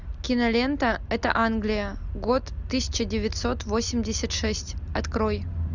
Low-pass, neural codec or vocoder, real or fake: 7.2 kHz; none; real